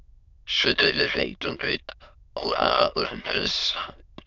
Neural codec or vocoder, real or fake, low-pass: autoencoder, 22.05 kHz, a latent of 192 numbers a frame, VITS, trained on many speakers; fake; 7.2 kHz